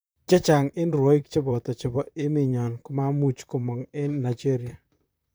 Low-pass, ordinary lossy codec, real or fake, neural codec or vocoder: none; none; fake; vocoder, 44.1 kHz, 128 mel bands, Pupu-Vocoder